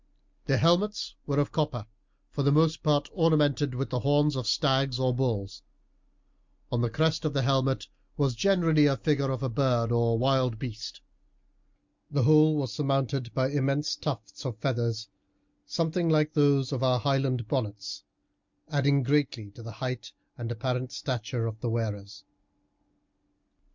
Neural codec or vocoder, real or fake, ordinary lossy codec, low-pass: none; real; MP3, 64 kbps; 7.2 kHz